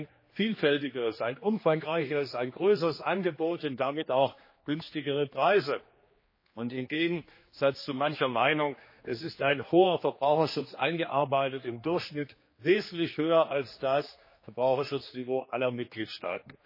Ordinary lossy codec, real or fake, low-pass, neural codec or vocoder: MP3, 24 kbps; fake; 5.4 kHz; codec, 16 kHz, 2 kbps, X-Codec, HuBERT features, trained on general audio